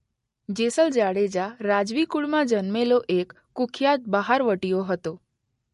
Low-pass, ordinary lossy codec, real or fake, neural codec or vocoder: 14.4 kHz; MP3, 48 kbps; real; none